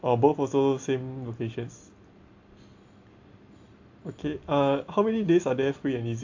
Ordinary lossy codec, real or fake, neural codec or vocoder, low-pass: AAC, 48 kbps; real; none; 7.2 kHz